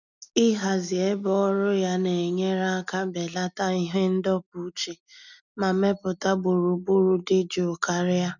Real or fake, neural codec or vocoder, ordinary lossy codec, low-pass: real; none; none; 7.2 kHz